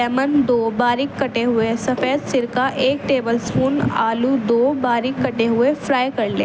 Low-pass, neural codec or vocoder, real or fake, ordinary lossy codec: none; none; real; none